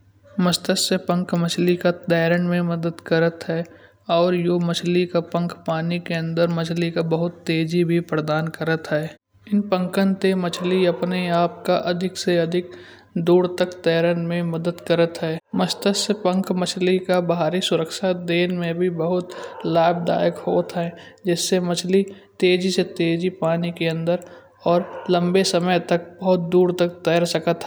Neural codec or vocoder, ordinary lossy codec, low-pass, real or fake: none; none; none; real